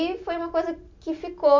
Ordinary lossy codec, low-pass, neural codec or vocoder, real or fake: MP3, 48 kbps; 7.2 kHz; none; real